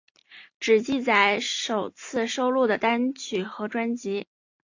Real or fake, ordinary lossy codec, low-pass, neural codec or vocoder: real; AAC, 48 kbps; 7.2 kHz; none